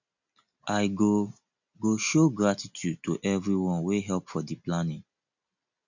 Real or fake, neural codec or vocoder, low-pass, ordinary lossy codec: real; none; 7.2 kHz; none